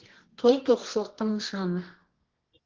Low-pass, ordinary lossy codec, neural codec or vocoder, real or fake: 7.2 kHz; Opus, 16 kbps; codec, 24 kHz, 0.9 kbps, WavTokenizer, medium music audio release; fake